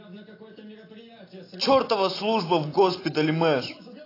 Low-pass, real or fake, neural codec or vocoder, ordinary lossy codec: 5.4 kHz; real; none; AAC, 24 kbps